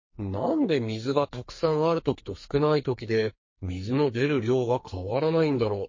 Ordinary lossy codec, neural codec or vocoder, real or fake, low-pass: MP3, 32 kbps; codec, 44.1 kHz, 2.6 kbps, SNAC; fake; 7.2 kHz